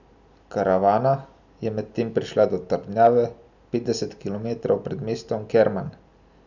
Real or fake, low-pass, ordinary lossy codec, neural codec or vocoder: real; 7.2 kHz; none; none